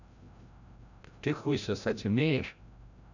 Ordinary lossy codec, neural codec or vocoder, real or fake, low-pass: none; codec, 16 kHz, 0.5 kbps, FreqCodec, larger model; fake; 7.2 kHz